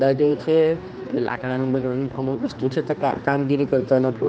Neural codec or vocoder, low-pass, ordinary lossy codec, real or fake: codec, 16 kHz, 2 kbps, X-Codec, HuBERT features, trained on general audio; none; none; fake